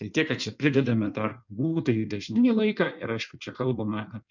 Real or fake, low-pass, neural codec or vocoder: fake; 7.2 kHz; codec, 16 kHz in and 24 kHz out, 1.1 kbps, FireRedTTS-2 codec